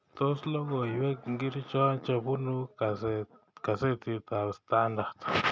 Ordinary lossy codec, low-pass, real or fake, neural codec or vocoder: none; none; real; none